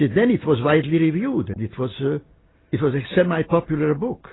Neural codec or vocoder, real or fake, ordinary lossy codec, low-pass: none; real; AAC, 16 kbps; 7.2 kHz